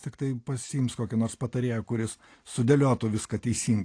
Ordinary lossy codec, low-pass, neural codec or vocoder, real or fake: AAC, 48 kbps; 9.9 kHz; none; real